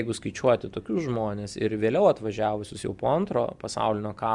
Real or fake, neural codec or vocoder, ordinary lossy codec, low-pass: real; none; Opus, 64 kbps; 10.8 kHz